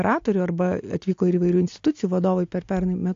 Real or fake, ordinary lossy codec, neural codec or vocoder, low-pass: real; AAC, 48 kbps; none; 7.2 kHz